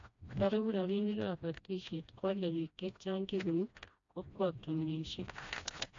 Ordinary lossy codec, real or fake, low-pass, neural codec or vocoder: MP3, 48 kbps; fake; 7.2 kHz; codec, 16 kHz, 1 kbps, FreqCodec, smaller model